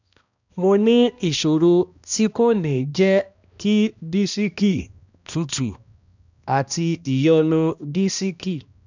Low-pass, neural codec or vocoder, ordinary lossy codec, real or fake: 7.2 kHz; codec, 16 kHz, 1 kbps, X-Codec, HuBERT features, trained on balanced general audio; none; fake